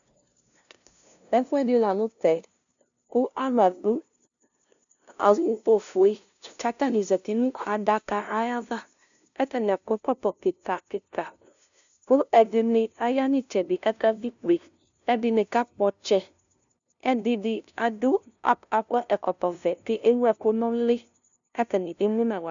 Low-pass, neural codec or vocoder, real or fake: 7.2 kHz; codec, 16 kHz, 0.5 kbps, FunCodec, trained on LibriTTS, 25 frames a second; fake